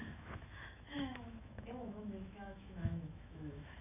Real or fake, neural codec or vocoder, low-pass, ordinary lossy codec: fake; autoencoder, 48 kHz, 128 numbers a frame, DAC-VAE, trained on Japanese speech; 3.6 kHz; none